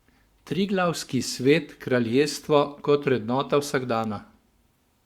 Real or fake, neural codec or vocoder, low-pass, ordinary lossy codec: fake; codec, 44.1 kHz, 7.8 kbps, Pupu-Codec; 19.8 kHz; Opus, 64 kbps